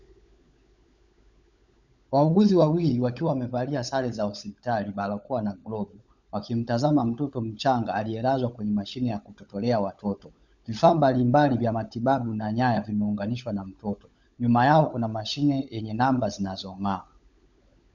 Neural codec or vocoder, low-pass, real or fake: codec, 16 kHz, 16 kbps, FunCodec, trained on LibriTTS, 50 frames a second; 7.2 kHz; fake